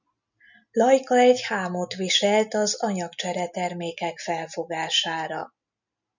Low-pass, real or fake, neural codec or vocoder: 7.2 kHz; real; none